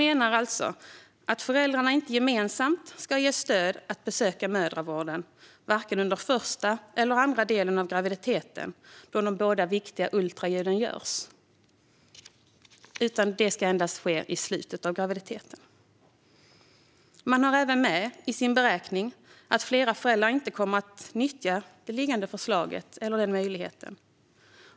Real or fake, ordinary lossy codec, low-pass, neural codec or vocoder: real; none; none; none